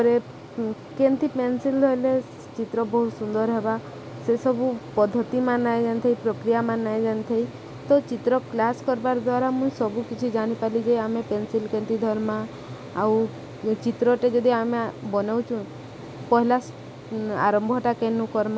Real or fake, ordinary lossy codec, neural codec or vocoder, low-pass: real; none; none; none